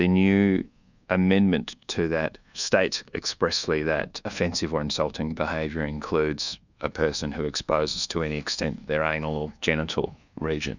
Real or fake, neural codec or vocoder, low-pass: fake; codec, 24 kHz, 1.2 kbps, DualCodec; 7.2 kHz